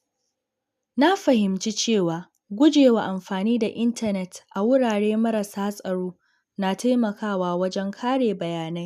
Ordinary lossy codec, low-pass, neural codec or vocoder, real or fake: none; 14.4 kHz; none; real